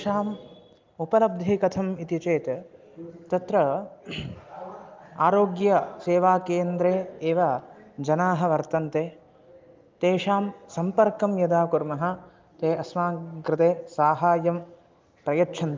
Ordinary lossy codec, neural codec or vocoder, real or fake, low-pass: Opus, 32 kbps; none; real; 7.2 kHz